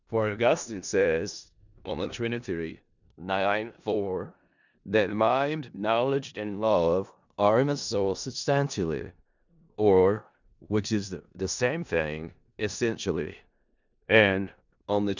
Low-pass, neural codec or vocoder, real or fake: 7.2 kHz; codec, 16 kHz in and 24 kHz out, 0.4 kbps, LongCat-Audio-Codec, four codebook decoder; fake